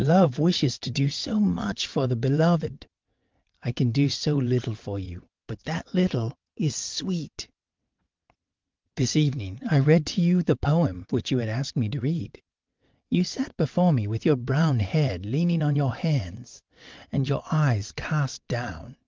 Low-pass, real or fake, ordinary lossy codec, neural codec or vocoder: 7.2 kHz; fake; Opus, 24 kbps; vocoder, 22.05 kHz, 80 mel bands, WaveNeXt